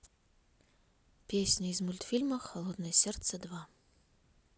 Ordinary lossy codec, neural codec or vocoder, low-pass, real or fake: none; none; none; real